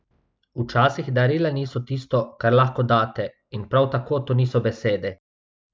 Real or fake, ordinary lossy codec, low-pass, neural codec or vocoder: real; none; none; none